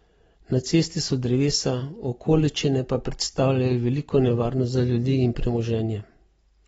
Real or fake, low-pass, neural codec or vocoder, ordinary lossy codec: real; 19.8 kHz; none; AAC, 24 kbps